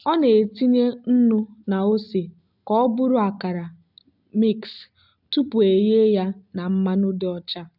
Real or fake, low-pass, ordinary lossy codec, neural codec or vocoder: real; 5.4 kHz; none; none